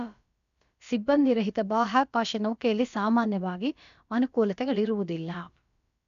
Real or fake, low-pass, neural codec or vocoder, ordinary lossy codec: fake; 7.2 kHz; codec, 16 kHz, about 1 kbps, DyCAST, with the encoder's durations; none